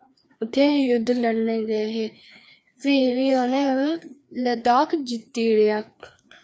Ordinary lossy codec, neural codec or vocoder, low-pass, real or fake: none; codec, 16 kHz, 2 kbps, FreqCodec, larger model; none; fake